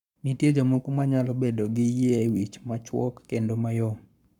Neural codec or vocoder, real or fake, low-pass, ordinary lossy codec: codec, 44.1 kHz, 7.8 kbps, Pupu-Codec; fake; 19.8 kHz; none